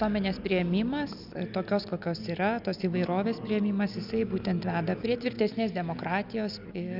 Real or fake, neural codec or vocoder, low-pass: real; none; 5.4 kHz